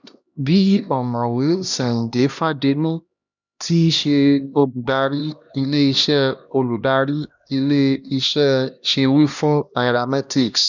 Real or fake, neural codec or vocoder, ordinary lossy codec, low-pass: fake; codec, 16 kHz, 1 kbps, X-Codec, HuBERT features, trained on LibriSpeech; none; 7.2 kHz